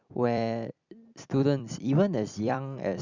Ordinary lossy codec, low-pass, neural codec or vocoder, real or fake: Opus, 64 kbps; 7.2 kHz; vocoder, 44.1 kHz, 128 mel bands every 256 samples, BigVGAN v2; fake